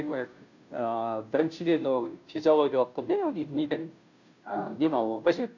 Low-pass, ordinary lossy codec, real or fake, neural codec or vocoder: 7.2 kHz; none; fake; codec, 16 kHz, 0.5 kbps, FunCodec, trained on Chinese and English, 25 frames a second